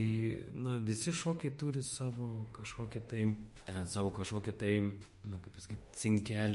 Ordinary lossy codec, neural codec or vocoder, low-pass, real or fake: MP3, 48 kbps; autoencoder, 48 kHz, 32 numbers a frame, DAC-VAE, trained on Japanese speech; 14.4 kHz; fake